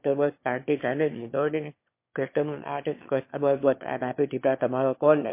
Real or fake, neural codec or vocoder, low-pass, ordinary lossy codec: fake; autoencoder, 22.05 kHz, a latent of 192 numbers a frame, VITS, trained on one speaker; 3.6 kHz; MP3, 24 kbps